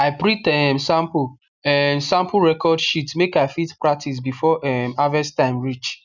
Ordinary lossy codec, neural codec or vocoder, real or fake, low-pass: none; none; real; 7.2 kHz